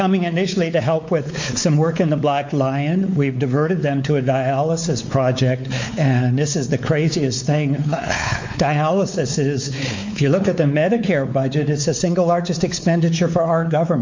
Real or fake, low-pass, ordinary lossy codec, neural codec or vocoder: fake; 7.2 kHz; MP3, 48 kbps; codec, 16 kHz, 4 kbps, X-Codec, WavLM features, trained on Multilingual LibriSpeech